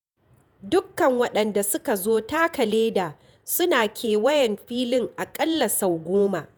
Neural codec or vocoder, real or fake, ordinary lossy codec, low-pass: vocoder, 48 kHz, 128 mel bands, Vocos; fake; none; none